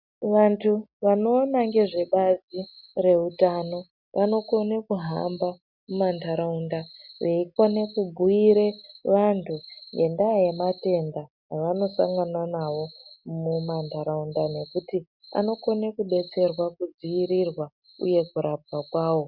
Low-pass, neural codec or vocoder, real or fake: 5.4 kHz; none; real